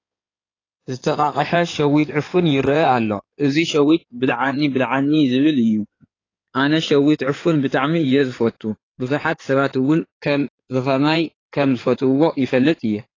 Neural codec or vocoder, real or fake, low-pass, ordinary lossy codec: codec, 16 kHz in and 24 kHz out, 2.2 kbps, FireRedTTS-2 codec; fake; 7.2 kHz; AAC, 32 kbps